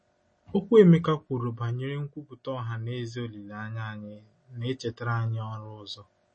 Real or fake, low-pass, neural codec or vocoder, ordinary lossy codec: real; 9.9 kHz; none; MP3, 32 kbps